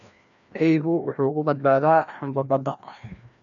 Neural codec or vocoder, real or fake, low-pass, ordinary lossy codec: codec, 16 kHz, 1 kbps, FreqCodec, larger model; fake; 7.2 kHz; none